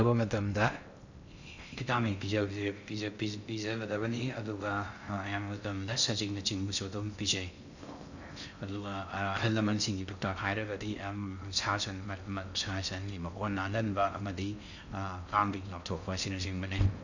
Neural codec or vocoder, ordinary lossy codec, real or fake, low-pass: codec, 16 kHz in and 24 kHz out, 0.6 kbps, FocalCodec, streaming, 2048 codes; none; fake; 7.2 kHz